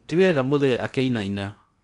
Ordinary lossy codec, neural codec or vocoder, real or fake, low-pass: none; codec, 16 kHz in and 24 kHz out, 0.8 kbps, FocalCodec, streaming, 65536 codes; fake; 10.8 kHz